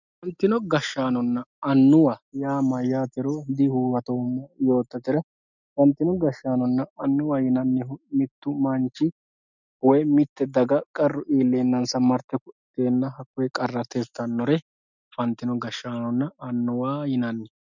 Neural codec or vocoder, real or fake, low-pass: none; real; 7.2 kHz